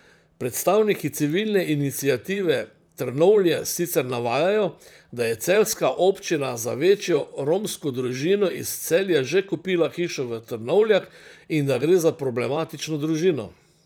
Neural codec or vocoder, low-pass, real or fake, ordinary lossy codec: vocoder, 44.1 kHz, 128 mel bands, Pupu-Vocoder; none; fake; none